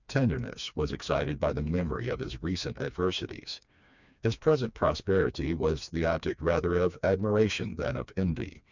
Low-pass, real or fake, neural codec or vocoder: 7.2 kHz; fake; codec, 16 kHz, 2 kbps, FreqCodec, smaller model